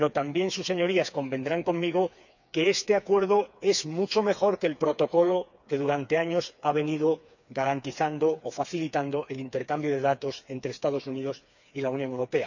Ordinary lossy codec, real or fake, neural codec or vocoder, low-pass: none; fake; codec, 16 kHz, 4 kbps, FreqCodec, smaller model; 7.2 kHz